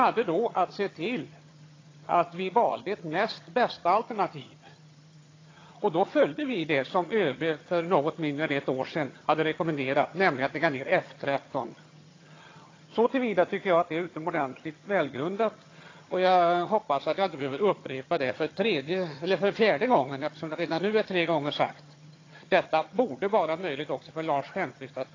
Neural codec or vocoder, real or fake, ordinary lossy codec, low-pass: vocoder, 22.05 kHz, 80 mel bands, HiFi-GAN; fake; AAC, 32 kbps; 7.2 kHz